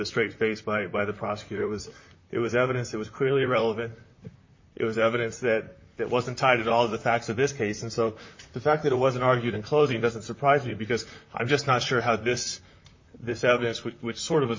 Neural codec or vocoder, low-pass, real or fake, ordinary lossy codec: vocoder, 44.1 kHz, 128 mel bands, Pupu-Vocoder; 7.2 kHz; fake; MP3, 32 kbps